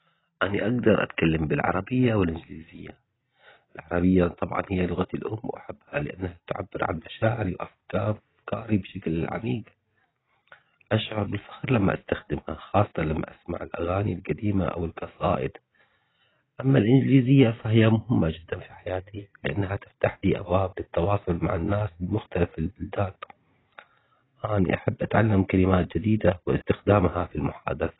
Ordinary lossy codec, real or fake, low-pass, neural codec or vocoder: AAC, 16 kbps; real; 7.2 kHz; none